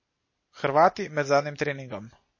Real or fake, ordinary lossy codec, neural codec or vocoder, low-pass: real; MP3, 32 kbps; none; 7.2 kHz